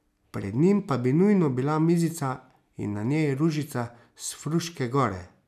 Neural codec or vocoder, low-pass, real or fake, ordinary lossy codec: none; 14.4 kHz; real; none